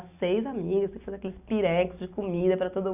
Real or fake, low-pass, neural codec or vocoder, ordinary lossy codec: real; 3.6 kHz; none; none